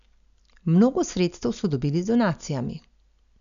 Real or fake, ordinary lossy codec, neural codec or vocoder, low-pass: real; none; none; 7.2 kHz